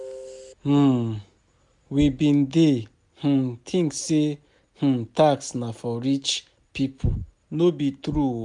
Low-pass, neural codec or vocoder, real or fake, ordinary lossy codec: 10.8 kHz; none; real; none